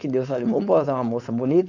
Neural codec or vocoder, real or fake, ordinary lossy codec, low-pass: codec, 16 kHz, 4.8 kbps, FACodec; fake; none; 7.2 kHz